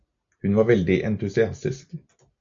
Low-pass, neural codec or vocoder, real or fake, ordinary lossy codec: 7.2 kHz; none; real; AAC, 64 kbps